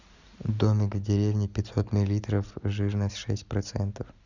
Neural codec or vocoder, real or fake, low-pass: none; real; 7.2 kHz